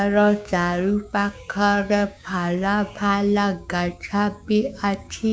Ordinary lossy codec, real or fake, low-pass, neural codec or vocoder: none; fake; none; codec, 16 kHz, 6 kbps, DAC